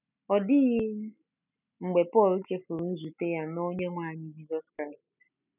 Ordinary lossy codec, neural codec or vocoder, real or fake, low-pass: none; none; real; 3.6 kHz